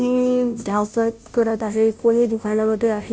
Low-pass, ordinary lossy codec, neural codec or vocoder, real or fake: none; none; codec, 16 kHz, 0.5 kbps, FunCodec, trained on Chinese and English, 25 frames a second; fake